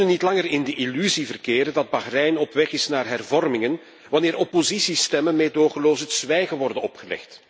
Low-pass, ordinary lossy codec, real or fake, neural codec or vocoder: none; none; real; none